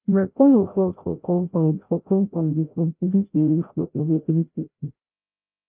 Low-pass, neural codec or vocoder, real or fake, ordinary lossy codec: 3.6 kHz; codec, 16 kHz, 0.5 kbps, FreqCodec, larger model; fake; Opus, 32 kbps